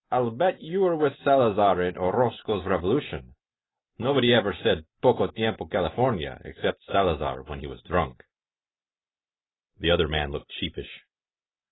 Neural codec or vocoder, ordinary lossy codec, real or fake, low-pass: none; AAC, 16 kbps; real; 7.2 kHz